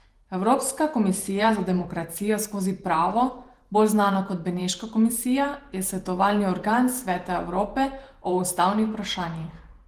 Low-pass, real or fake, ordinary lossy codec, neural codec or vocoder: 14.4 kHz; fake; Opus, 24 kbps; vocoder, 48 kHz, 128 mel bands, Vocos